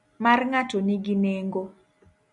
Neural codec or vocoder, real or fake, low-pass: none; real; 10.8 kHz